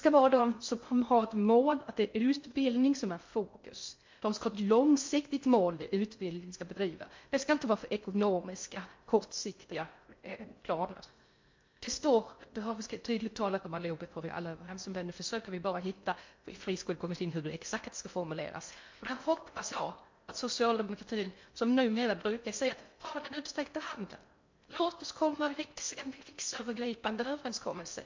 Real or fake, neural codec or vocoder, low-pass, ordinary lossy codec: fake; codec, 16 kHz in and 24 kHz out, 0.6 kbps, FocalCodec, streaming, 2048 codes; 7.2 kHz; MP3, 48 kbps